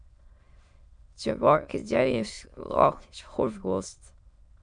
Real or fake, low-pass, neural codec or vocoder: fake; 9.9 kHz; autoencoder, 22.05 kHz, a latent of 192 numbers a frame, VITS, trained on many speakers